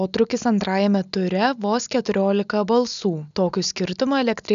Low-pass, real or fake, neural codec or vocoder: 7.2 kHz; real; none